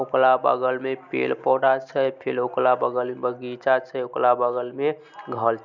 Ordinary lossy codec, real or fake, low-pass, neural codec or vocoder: none; real; 7.2 kHz; none